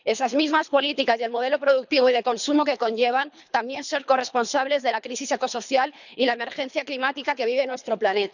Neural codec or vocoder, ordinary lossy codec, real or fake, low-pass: codec, 24 kHz, 3 kbps, HILCodec; none; fake; 7.2 kHz